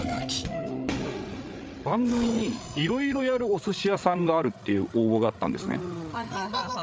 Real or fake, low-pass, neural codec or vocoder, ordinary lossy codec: fake; none; codec, 16 kHz, 8 kbps, FreqCodec, larger model; none